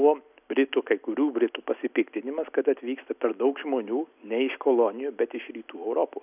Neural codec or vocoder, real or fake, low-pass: none; real; 3.6 kHz